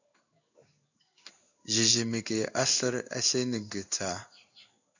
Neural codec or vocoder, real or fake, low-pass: codec, 16 kHz in and 24 kHz out, 1 kbps, XY-Tokenizer; fake; 7.2 kHz